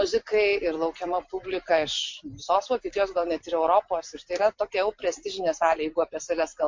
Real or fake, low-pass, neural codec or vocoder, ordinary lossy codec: real; 7.2 kHz; none; MP3, 48 kbps